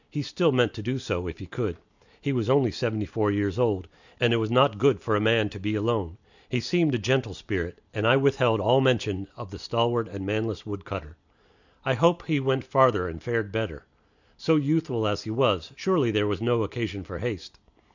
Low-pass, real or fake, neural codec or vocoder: 7.2 kHz; real; none